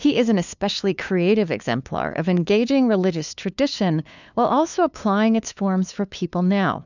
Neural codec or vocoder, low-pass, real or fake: codec, 16 kHz, 2 kbps, FunCodec, trained on LibriTTS, 25 frames a second; 7.2 kHz; fake